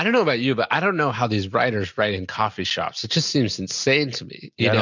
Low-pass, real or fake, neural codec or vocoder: 7.2 kHz; real; none